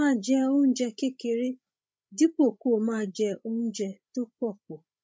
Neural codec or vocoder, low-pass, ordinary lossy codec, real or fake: codec, 16 kHz, 16 kbps, FreqCodec, larger model; none; none; fake